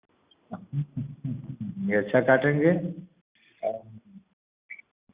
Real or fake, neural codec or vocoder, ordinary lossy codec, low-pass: real; none; Opus, 64 kbps; 3.6 kHz